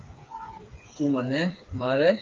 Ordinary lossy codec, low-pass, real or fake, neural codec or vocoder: Opus, 24 kbps; 7.2 kHz; fake; codec, 16 kHz, 4 kbps, FreqCodec, smaller model